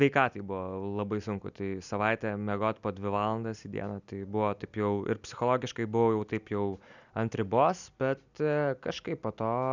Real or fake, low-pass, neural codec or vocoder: real; 7.2 kHz; none